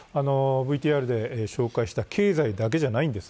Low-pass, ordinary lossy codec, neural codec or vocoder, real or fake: none; none; none; real